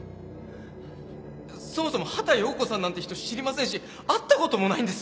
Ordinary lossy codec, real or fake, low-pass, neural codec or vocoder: none; real; none; none